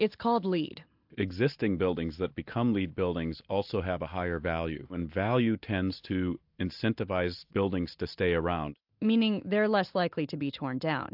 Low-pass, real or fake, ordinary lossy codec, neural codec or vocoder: 5.4 kHz; real; AAC, 48 kbps; none